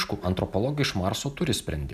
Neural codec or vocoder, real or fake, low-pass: none; real; 14.4 kHz